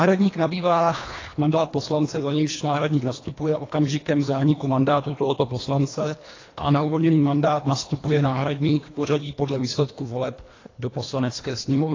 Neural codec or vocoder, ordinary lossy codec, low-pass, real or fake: codec, 24 kHz, 1.5 kbps, HILCodec; AAC, 32 kbps; 7.2 kHz; fake